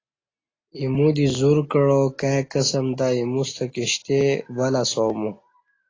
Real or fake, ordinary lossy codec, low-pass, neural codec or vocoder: real; AAC, 32 kbps; 7.2 kHz; none